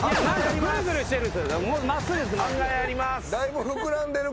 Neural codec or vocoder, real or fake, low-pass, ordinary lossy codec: none; real; none; none